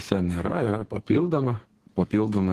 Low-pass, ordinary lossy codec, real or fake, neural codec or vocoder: 14.4 kHz; Opus, 16 kbps; fake; codec, 32 kHz, 1.9 kbps, SNAC